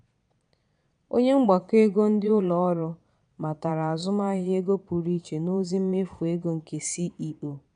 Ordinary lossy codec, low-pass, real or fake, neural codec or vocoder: none; 9.9 kHz; fake; vocoder, 22.05 kHz, 80 mel bands, Vocos